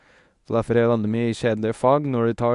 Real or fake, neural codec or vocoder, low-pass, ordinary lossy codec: fake; codec, 24 kHz, 0.9 kbps, WavTokenizer, medium speech release version 1; 10.8 kHz; none